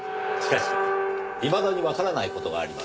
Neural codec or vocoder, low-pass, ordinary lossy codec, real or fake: none; none; none; real